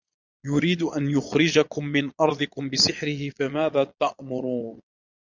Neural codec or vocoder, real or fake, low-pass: vocoder, 22.05 kHz, 80 mel bands, Vocos; fake; 7.2 kHz